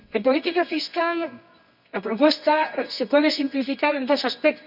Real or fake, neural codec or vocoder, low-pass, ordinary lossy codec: fake; codec, 24 kHz, 0.9 kbps, WavTokenizer, medium music audio release; 5.4 kHz; none